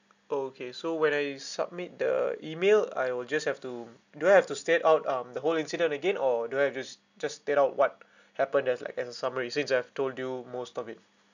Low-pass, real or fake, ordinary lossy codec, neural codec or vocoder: 7.2 kHz; real; none; none